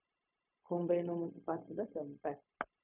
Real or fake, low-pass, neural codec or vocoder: fake; 3.6 kHz; codec, 16 kHz, 0.4 kbps, LongCat-Audio-Codec